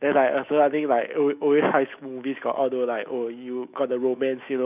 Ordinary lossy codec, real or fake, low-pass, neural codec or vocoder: none; fake; 3.6 kHz; autoencoder, 48 kHz, 128 numbers a frame, DAC-VAE, trained on Japanese speech